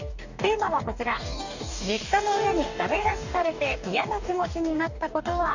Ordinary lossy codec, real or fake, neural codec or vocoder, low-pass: none; fake; codec, 44.1 kHz, 2.6 kbps, DAC; 7.2 kHz